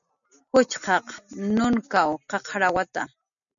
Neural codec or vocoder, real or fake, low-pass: none; real; 7.2 kHz